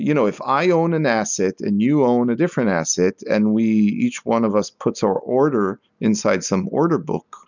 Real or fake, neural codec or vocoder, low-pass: real; none; 7.2 kHz